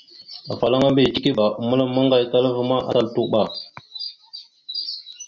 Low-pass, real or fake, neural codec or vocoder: 7.2 kHz; real; none